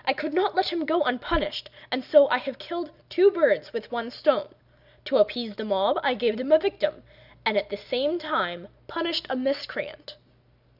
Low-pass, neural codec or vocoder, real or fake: 5.4 kHz; none; real